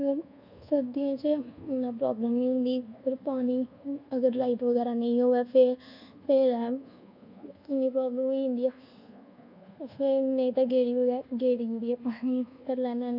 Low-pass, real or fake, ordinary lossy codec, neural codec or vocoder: 5.4 kHz; fake; none; codec, 24 kHz, 1.2 kbps, DualCodec